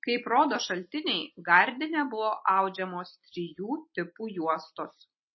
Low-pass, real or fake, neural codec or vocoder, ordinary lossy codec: 7.2 kHz; real; none; MP3, 24 kbps